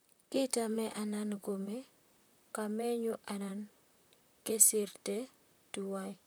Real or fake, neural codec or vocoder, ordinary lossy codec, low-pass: fake; vocoder, 44.1 kHz, 128 mel bands, Pupu-Vocoder; none; none